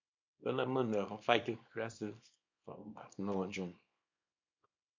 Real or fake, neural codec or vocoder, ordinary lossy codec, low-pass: fake; codec, 24 kHz, 0.9 kbps, WavTokenizer, small release; MP3, 64 kbps; 7.2 kHz